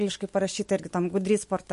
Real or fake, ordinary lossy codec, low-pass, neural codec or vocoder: real; MP3, 48 kbps; 10.8 kHz; none